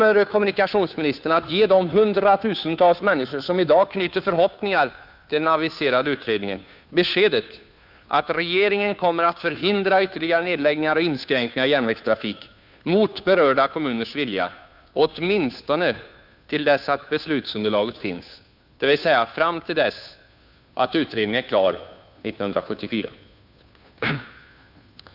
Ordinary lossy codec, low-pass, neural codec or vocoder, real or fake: none; 5.4 kHz; codec, 16 kHz, 2 kbps, FunCodec, trained on Chinese and English, 25 frames a second; fake